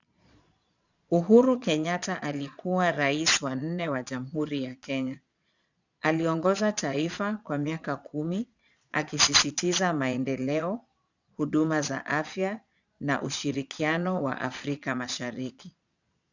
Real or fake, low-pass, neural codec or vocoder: fake; 7.2 kHz; vocoder, 22.05 kHz, 80 mel bands, Vocos